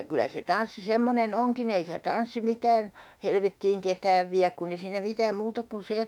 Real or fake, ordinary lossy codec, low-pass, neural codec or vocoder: fake; none; 19.8 kHz; autoencoder, 48 kHz, 32 numbers a frame, DAC-VAE, trained on Japanese speech